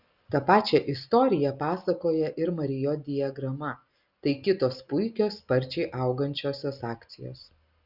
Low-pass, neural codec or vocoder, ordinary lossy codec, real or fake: 5.4 kHz; none; Opus, 64 kbps; real